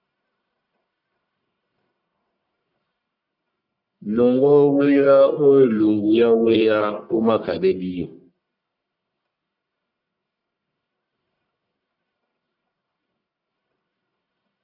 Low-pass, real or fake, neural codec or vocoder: 5.4 kHz; fake; codec, 44.1 kHz, 1.7 kbps, Pupu-Codec